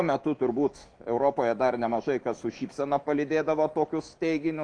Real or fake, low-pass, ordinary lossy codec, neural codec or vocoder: fake; 9.9 kHz; Opus, 32 kbps; autoencoder, 48 kHz, 128 numbers a frame, DAC-VAE, trained on Japanese speech